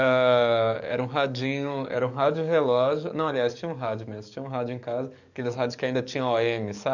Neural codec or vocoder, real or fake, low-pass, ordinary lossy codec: codec, 44.1 kHz, 7.8 kbps, DAC; fake; 7.2 kHz; none